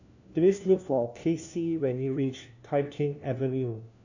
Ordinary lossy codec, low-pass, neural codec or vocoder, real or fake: none; 7.2 kHz; codec, 16 kHz, 1 kbps, FunCodec, trained on LibriTTS, 50 frames a second; fake